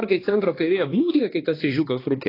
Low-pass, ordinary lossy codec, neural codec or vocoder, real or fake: 5.4 kHz; AAC, 24 kbps; codec, 16 kHz, 2 kbps, X-Codec, HuBERT features, trained on balanced general audio; fake